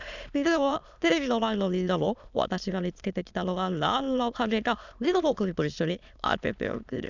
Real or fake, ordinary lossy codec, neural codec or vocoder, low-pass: fake; none; autoencoder, 22.05 kHz, a latent of 192 numbers a frame, VITS, trained on many speakers; 7.2 kHz